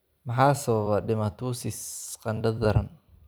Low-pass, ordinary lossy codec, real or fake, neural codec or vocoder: none; none; fake; vocoder, 44.1 kHz, 128 mel bands every 256 samples, BigVGAN v2